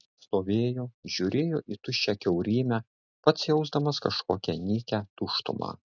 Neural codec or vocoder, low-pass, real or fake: none; 7.2 kHz; real